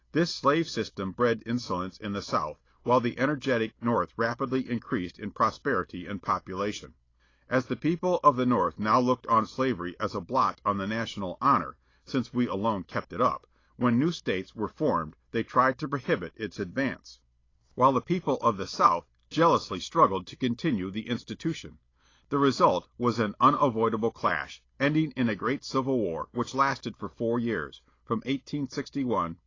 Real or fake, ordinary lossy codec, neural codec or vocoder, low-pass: real; AAC, 32 kbps; none; 7.2 kHz